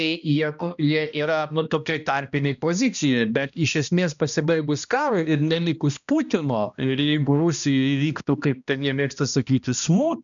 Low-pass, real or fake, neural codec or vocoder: 7.2 kHz; fake; codec, 16 kHz, 1 kbps, X-Codec, HuBERT features, trained on balanced general audio